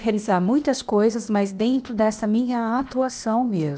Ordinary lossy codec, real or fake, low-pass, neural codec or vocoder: none; fake; none; codec, 16 kHz, 0.8 kbps, ZipCodec